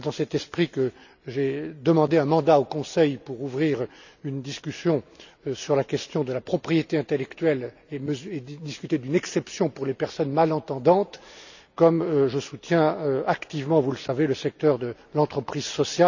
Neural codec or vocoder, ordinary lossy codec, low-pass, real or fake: none; none; 7.2 kHz; real